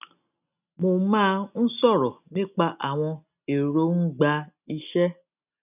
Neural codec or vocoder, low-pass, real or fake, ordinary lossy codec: none; 3.6 kHz; real; none